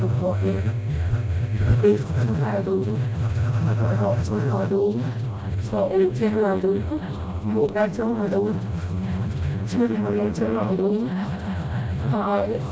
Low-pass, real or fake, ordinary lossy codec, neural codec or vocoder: none; fake; none; codec, 16 kHz, 0.5 kbps, FreqCodec, smaller model